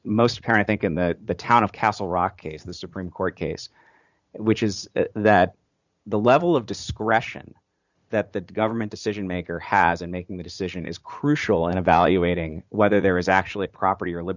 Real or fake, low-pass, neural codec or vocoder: fake; 7.2 kHz; vocoder, 44.1 kHz, 80 mel bands, Vocos